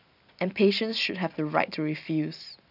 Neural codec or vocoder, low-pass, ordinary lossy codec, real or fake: none; 5.4 kHz; none; real